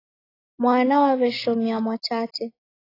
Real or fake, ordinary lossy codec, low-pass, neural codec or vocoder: real; AAC, 24 kbps; 5.4 kHz; none